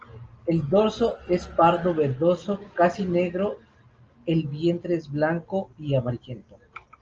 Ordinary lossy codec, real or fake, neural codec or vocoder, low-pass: Opus, 24 kbps; real; none; 7.2 kHz